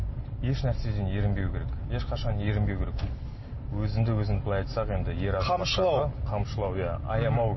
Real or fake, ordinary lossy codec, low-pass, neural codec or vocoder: real; MP3, 24 kbps; 7.2 kHz; none